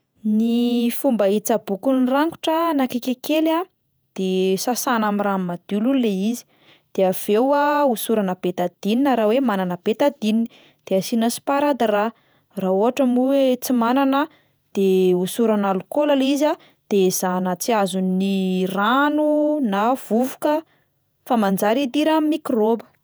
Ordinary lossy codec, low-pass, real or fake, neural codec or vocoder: none; none; fake; vocoder, 48 kHz, 128 mel bands, Vocos